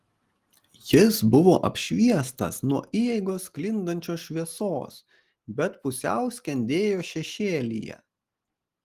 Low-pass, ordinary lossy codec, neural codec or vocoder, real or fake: 14.4 kHz; Opus, 24 kbps; none; real